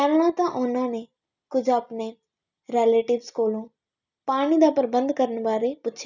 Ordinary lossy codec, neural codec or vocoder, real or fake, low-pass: none; none; real; 7.2 kHz